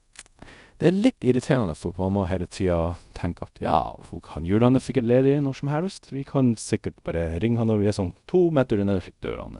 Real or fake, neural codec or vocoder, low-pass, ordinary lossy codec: fake; codec, 24 kHz, 0.5 kbps, DualCodec; 10.8 kHz; none